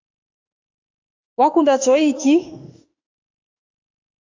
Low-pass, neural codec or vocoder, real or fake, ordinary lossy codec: 7.2 kHz; autoencoder, 48 kHz, 32 numbers a frame, DAC-VAE, trained on Japanese speech; fake; AAC, 48 kbps